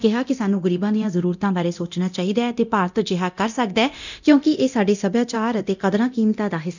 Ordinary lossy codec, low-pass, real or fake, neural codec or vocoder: none; 7.2 kHz; fake; codec, 24 kHz, 0.9 kbps, DualCodec